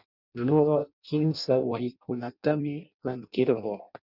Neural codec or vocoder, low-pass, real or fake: codec, 16 kHz in and 24 kHz out, 0.6 kbps, FireRedTTS-2 codec; 5.4 kHz; fake